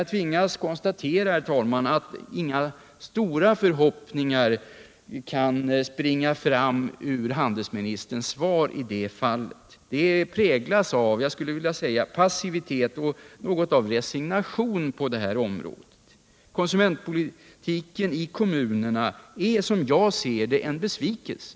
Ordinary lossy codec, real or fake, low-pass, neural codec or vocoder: none; real; none; none